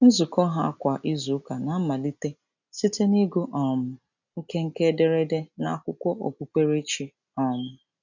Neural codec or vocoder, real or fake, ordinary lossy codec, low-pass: none; real; none; 7.2 kHz